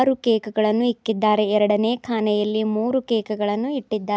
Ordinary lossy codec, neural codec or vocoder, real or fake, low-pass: none; none; real; none